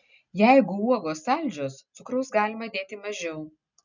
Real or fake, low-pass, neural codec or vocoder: real; 7.2 kHz; none